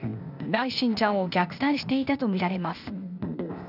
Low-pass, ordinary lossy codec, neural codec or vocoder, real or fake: 5.4 kHz; none; codec, 16 kHz, 0.8 kbps, ZipCodec; fake